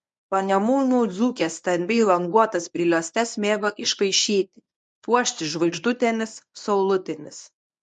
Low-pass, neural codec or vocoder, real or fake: 10.8 kHz; codec, 24 kHz, 0.9 kbps, WavTokenizer, medium speech release version 1; fake